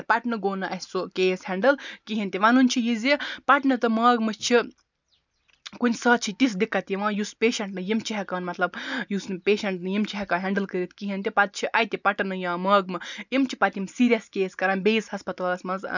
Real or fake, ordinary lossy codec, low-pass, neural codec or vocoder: real; none; 7.2 kHz; none